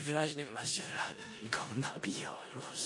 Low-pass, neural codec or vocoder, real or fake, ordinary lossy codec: 10.8 kHz; codec, 16 kHz in and 24 kHz out, 0.4 kbps, LongCat-Audio-Codec, four codebook decoder; fake; MP3, 48 kbps